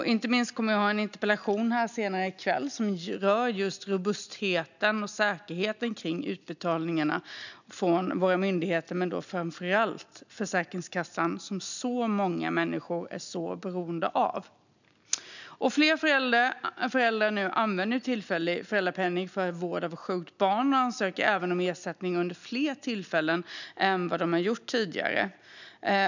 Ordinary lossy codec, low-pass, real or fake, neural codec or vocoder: none; 7.2 kHz; real; none